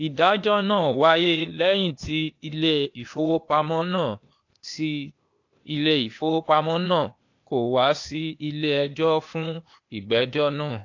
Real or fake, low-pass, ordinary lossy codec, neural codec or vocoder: fake; 7.2 kHz; AAC, 48 kbps; codec, 16 kHz, 0.8 kbps, ZipCodec